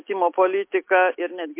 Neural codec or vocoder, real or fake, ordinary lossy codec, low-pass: none; real; MP3, 24 kbps; 3.6 kHz